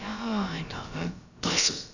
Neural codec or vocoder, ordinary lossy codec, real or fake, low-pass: codec, 16 kHz, about 1 kbps, DyCAST, with the encoder's durations; none; fake; 7.2 kHz